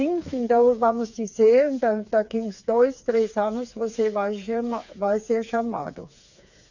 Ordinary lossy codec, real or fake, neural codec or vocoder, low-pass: none; fake; codec, 16 kHz, 4 kbps, FreqCodec, smaller model; 7.2 kHz